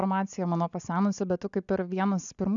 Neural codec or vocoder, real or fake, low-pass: none; real; 7.2 kHz